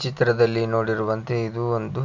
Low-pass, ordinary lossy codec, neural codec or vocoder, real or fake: 7.2 kHz; none; none; real